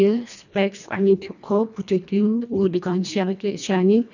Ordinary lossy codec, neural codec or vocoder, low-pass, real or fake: none; codec, 24 kHz, 1.5 kbps, HILCodec; 7.2 kHz; fake